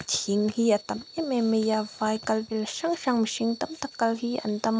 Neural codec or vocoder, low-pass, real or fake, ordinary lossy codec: none; none; real; none